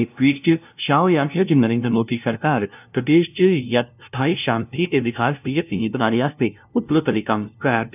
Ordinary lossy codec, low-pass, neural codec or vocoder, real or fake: none; 3.6 kHz; codec, 16 kHz, 0.5 kbps, FunCodec, trained on LibriTTS, 25 frames a second; fake